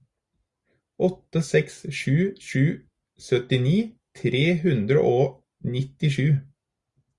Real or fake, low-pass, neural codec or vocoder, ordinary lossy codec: real; 10.8 kHz; none; Opus, 64 kbps